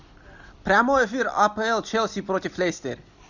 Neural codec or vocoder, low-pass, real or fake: none; 7.2 kHz; real